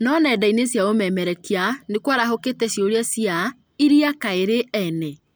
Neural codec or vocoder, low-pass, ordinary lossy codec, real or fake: none; none; none; real